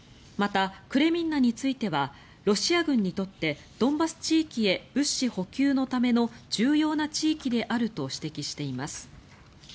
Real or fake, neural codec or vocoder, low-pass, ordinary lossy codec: real; none; none; none